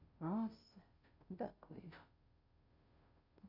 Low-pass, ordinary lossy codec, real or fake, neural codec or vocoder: 5.4 kHz; none; fake; codec, 16 kHz, 0.5 kbps, FunCodec, trained on Chinese and English, 25 frames a second